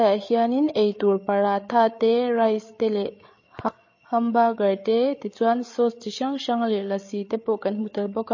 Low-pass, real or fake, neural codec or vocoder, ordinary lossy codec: 7.2 kHz; fake; codec, 16 kHz, 8 kbps, FreqCodec, larger model; MP3, 32 kbps